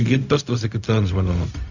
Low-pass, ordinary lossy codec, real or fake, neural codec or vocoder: 7.2 kHz; none; fake; codec, 16 kHz, 0.4 kbps, LongCat-Audio-Codec